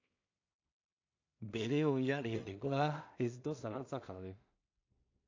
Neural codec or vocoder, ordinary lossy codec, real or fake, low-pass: codec, 16 kHz in and 24 kHz out, 0.4 kbps, LongCat-Audio-Codec, two codebook decoder; AAC, 48 kbps; fake; 7.2 kHz